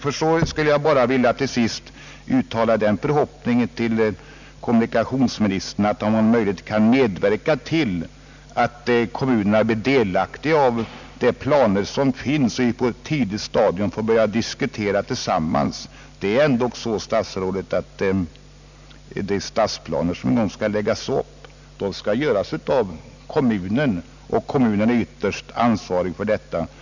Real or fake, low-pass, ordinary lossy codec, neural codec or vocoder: real; 7.2 kHz; none; none